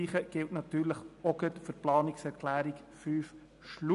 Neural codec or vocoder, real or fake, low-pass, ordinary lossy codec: none; real; 10.8 kHz; none